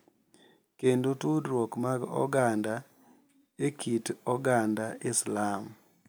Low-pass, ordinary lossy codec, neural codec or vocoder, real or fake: none; none; none; real